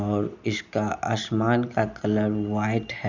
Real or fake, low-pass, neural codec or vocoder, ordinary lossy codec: real; 7.2 kHz; none; none